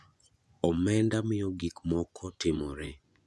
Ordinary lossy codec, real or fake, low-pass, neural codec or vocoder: none; fake; none; vocoder, 24 kHz, 100 mel bands, Vocos